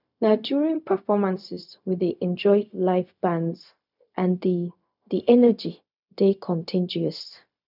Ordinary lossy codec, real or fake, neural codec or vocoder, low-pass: none; fake; codec, 16 kHz, 0.4 kbps, LongCat-Audio-Codec; 5.4 kHz